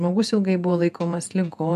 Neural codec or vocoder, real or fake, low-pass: vocoder, 48 kHz, 128 mel bands, Vocos; fake; 14.4 kHz